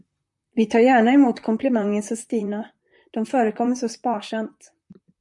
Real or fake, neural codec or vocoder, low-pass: fake; vocoder, 44.1 kHz, 128 mel bands, Pupu-Vocoder; 10.8 kHz